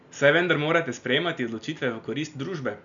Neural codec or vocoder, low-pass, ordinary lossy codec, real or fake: none; 7.2 kHz; none; real